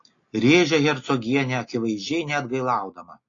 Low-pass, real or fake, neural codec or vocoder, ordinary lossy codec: 7.2 kHz; real; none; AAC, 32 kbps